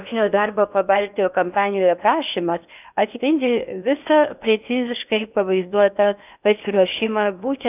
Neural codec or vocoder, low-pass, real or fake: codec, 16 kHz in and 24 kHz out, 0.8 kbps, FocalCodec, streaming, 65536 codes; 3.6 kHz; fake